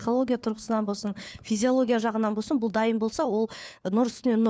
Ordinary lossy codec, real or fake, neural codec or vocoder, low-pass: none; fake; codec, 16 kHz, 4 kbps, FunCodec, trained on LibriTTS, 50 frames a second; none